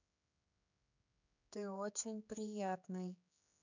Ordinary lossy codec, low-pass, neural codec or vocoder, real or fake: none; 7.2 kHz; codec, 16 kHz, 4 kbps, X-Codec, HuBERT features, trained on general audio; fake